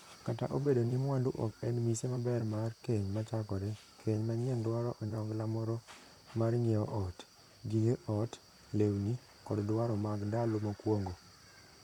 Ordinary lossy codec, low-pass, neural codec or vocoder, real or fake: none; 19.8 kHz; vocoder, 44.1 kHz, 128 mel bands, Pupu-Vocoder; fake